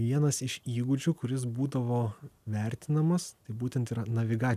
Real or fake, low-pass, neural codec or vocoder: real; 14.4 kHz; none